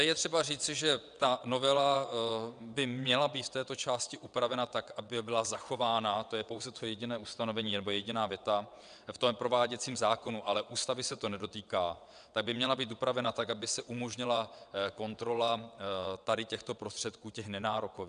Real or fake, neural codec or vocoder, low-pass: fake; vocoder, 22.05 kHz, 80 mel bands, WaveNeXt; 9.9 kHz